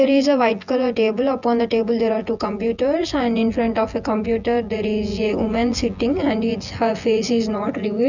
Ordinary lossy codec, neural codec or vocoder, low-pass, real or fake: none; vocoder, 24 kHz, 100 mel bands, Vocos; 7.2 kHz; fake